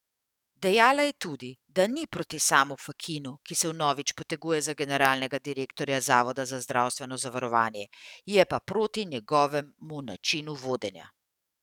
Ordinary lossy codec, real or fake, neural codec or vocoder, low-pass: none; fake; codec, 44.1 kHz, 7.8 kbps, DAC; 19.8 kHz